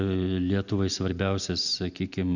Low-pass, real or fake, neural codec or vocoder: 7.2 kHz; real; none